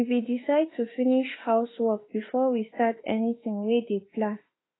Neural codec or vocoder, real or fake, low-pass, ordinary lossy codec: autoencoder, 48 kHz, 32 numbers a frame, DAC-VAE, trained on Japanese speech; fake; 7.2 kHz; AAC, 16 kbps